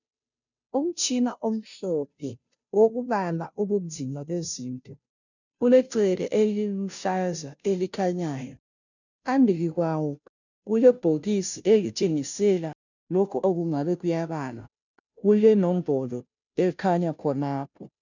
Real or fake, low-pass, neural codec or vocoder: fake; 7.2 kHz; codec, 16 kHz, 0.5 kbps, FunCodec, trained on Chinese and English, 25 frames a second